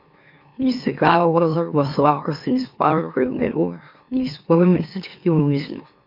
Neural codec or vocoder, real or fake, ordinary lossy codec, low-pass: autoencoder, 44.1 kHz, a latent of 192 numbers a frame, MeloTTS; fake; MP3, 48 kbps; 5.4 kHz